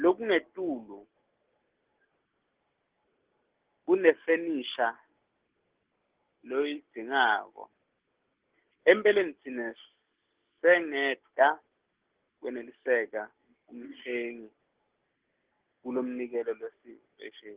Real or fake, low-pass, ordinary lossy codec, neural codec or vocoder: real; 3.6 kHz; Opus, 16 kbps; none